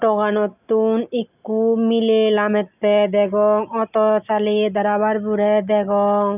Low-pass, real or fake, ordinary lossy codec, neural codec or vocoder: 3.6 kHz; real; none; none